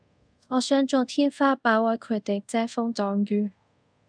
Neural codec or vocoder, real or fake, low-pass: codec, 24 kHz, 0.5 kbps, DualCodec; fake; 9.9 kHz